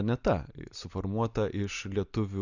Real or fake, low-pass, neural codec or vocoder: real; 7.2 kHz; none